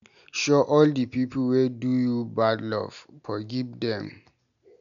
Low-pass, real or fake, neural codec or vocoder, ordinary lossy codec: 7.2 kHz; real; none; none